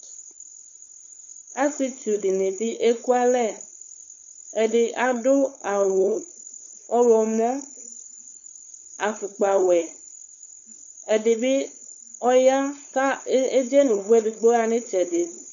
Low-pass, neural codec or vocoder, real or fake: 7.2 kHz; codec, 16 kHz, 4.8 kbps, FACodec; fake